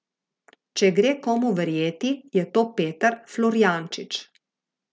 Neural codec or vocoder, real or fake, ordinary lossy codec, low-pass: none; real; none; none